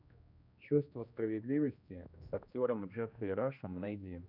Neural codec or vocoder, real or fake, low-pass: codec, 16 kHz, 1 kbps, X-Codec, HuBERT features, trained on general audio; fake; 5.4 kHz